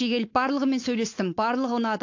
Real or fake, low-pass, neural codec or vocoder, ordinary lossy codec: fake; 7.2 kHz; codec, 16 kHz, 4.8 kbps, FACodec; AAC, 48 kbps